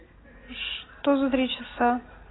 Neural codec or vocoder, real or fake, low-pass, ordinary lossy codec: none; real; 7.2 kHz; AAC, 16 kbps